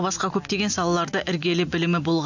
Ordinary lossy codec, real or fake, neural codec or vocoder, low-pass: none; real; none; 7.2 kHz